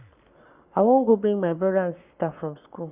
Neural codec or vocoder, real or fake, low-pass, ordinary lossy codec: codec, 44.1 kHz, 7.8 kbps, Pupu-Codec; fake; 3.6 kHz; Opus, 64 kbps